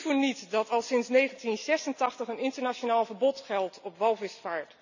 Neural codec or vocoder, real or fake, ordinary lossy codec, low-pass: none; real; none; 7.2 kHz